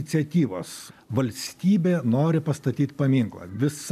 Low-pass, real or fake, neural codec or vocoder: 14.4 kHz; real; none